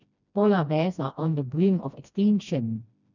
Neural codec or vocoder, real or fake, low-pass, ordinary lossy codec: codec, 16 kHz, 1 kbps, FreqCodec, smaller model; fake; 7.2 kHz; none